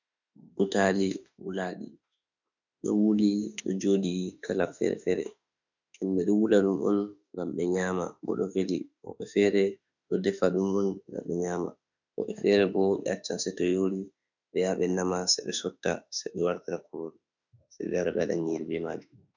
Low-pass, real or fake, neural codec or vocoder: 7.2 kHz; fake; autoencoder, 48 kHz, 32 numbers a frame, DAC-VAE, trained on Japanese speech